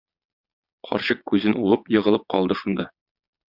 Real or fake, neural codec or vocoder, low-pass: fake; vocoder, 22.05 kHz, 80 mel bands, Vocos; 5.4 kHz